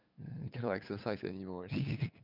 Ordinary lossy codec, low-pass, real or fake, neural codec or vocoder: none; 5.4 kHz; fake; codec, 16 kHz, 8 kbps, FunCodec, trained on LibriTTS, 25 frames a second